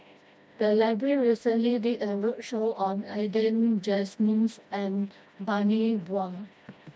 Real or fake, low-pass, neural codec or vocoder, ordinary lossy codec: fake; none; codec, 16 kHz, 1 kbps, FreqCodec, smaller model; none